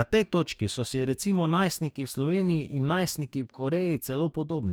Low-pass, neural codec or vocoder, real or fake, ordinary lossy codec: none; codec, 44.1 kHz, 2.6 kbps, DAC; fake; none